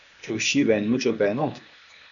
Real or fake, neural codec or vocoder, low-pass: fake; codec, 16 kHz, 0.8 kbps, ZipCodec; 7.2 kHz